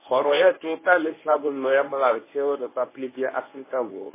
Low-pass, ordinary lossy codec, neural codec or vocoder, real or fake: 3.6 kHz; MP3, 16 kbps; codec, 24 kHz, 0.9 kbps, WavTokenizer, medium speech release version 1; fake